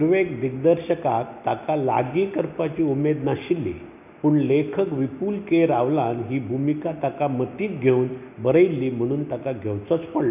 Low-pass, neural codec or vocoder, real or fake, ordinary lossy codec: 3.6 kHz; none; real; none